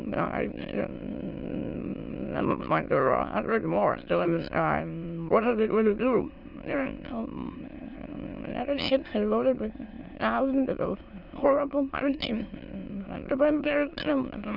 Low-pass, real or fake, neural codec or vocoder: 5.4 kHz; fake; autoencoder, 22.05 kHz, a latent of 192 numbers a frame, VITS, trained on many speakers